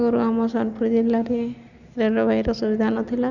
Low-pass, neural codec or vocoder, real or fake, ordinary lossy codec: 7.2 kHz; none; real; none